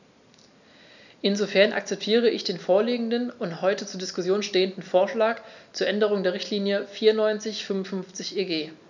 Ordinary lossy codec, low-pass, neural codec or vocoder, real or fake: none; 7.2 kHz; none; real